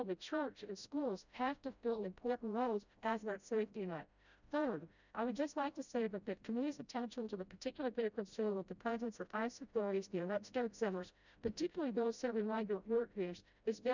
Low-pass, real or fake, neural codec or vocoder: 7.2 kHz; fake; codec, 16 kHz, 0.5 kbps, FreqCodec, smaller model